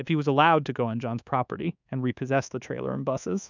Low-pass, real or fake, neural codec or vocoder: 7.2 kHz; fake; codec, 24 kHz, 1.2 kbps, DualCodec